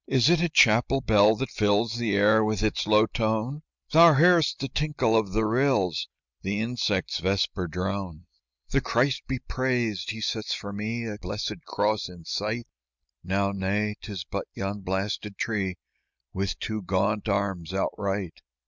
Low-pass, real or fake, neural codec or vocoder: 7.2 kHz; real; none